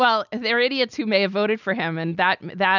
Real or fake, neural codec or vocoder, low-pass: real; none; 7.2 kHz